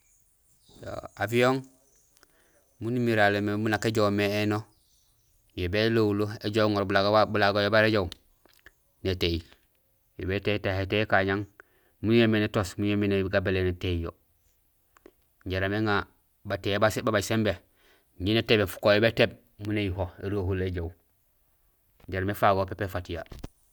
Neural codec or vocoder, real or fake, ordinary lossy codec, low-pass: none; real; none; none